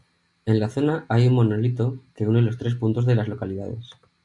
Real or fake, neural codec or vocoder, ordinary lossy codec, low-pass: real; none; MP3, 64 kbps; 10.8 kHz